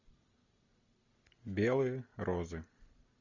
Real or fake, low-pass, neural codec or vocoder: real; 7.2 kHz; none